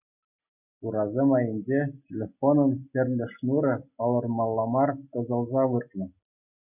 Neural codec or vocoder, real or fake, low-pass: none; real; 3.6 kHz